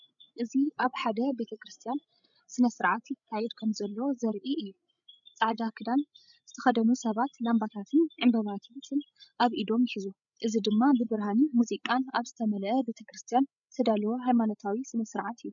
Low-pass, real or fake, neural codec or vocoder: 7.2 kHz; fake; codec, 16 kHz, 16 kbps, FreqCodec, larger model